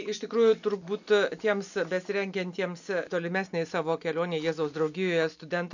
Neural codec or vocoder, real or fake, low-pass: none; real; 7.2 kHz